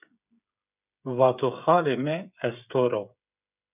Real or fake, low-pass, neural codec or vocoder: fake; 3.6 kHz; codec, 16 kHz, 8 kbps, FreqCodec, smaller model